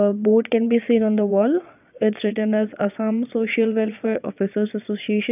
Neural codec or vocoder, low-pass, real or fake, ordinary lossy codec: vocoder, 22.05 kHz, 80 mel bands, Vocos; 3.6 kHz; fake; none